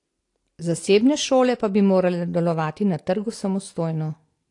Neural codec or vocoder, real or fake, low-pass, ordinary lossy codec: none; real; 10.8 kHz; AAC, 48 kbps